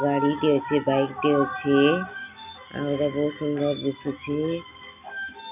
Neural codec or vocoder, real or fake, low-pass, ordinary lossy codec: none; real; 3.6 kHz; none